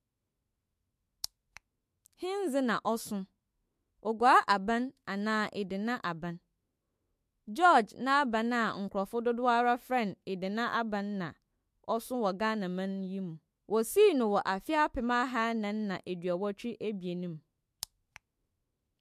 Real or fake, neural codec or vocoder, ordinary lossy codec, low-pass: fake; autoencoder, 48 kHz, 128 numbers a frame, DAC-VAE, trained on Japanese speech; MP3, 64 kbps; 14.4 kHz